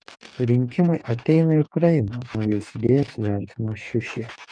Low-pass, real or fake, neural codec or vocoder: 9.9 kHz; fake; codec, 44.1 kHz, 2.6 kbps, DAC